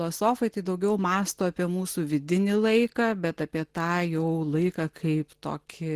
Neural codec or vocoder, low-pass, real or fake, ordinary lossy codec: none; 14.4 kHz; real; Opus, 16 kbps